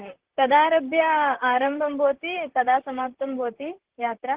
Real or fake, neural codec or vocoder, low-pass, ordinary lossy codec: fake; vocoder, 44.1 kHz, 128 mel bands, Pupu-Vocoder; 3.6 kHz; Opus, 16 kbps